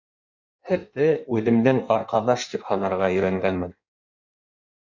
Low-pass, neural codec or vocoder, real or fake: 7.2 kHz; codec, 16 kHz in and 24 kHz out, 1.1 kbps, FireRedTTS-2 codec; fake